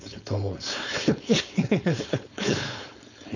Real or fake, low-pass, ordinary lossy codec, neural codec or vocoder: fake; 7.2 kHz; none; codec, 16 kHz, 4.8 kbps, FACodec